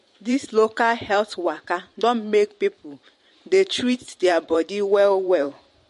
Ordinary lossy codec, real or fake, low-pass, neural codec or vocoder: MP3, 48 kbps; fake; 14.4 kHz; vocoder, 44.1 kHz, 128 mel bands every 512 samples, BigVGAN v2